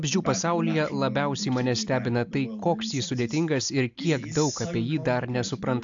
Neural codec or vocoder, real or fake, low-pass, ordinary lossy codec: none; real; 7.2 kHz; AAC, 64 kbps